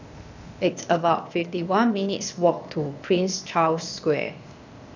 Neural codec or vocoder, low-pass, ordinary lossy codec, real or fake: codec, 16 kHz, 0.8 kbps, ZipCodec; 7.2 kHz; none; fake